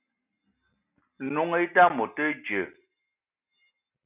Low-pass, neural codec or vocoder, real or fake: 3.6 kHz; none; real